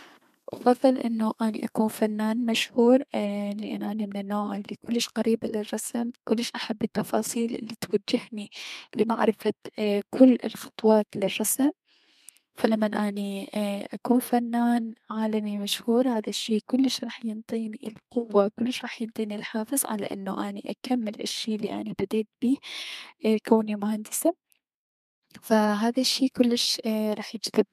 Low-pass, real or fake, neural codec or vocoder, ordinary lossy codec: 14.4 kHz; fake; codec, 32 kHz, 1.9 kbps, SNAC; MP3, 96 kbps